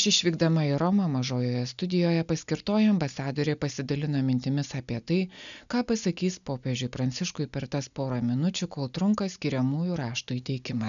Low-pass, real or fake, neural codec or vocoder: 7.2 kHz; real; none